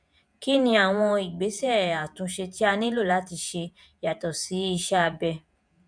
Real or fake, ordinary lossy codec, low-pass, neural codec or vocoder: fake; none; 9.9 kHz; vocoder, 48 kHz, 128 mel bands, Vocos